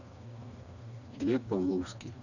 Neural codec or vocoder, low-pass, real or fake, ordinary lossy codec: codec, 16 kHz, 2 kbps, FreqCodec, smaller model; 7.2 kHz; fake; none